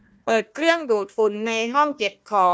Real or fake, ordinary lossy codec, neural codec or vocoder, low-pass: fake; none; codec, 16 kHz, 1 kbps, FunCodec, trained on Chinese and English, 50 frames a second; none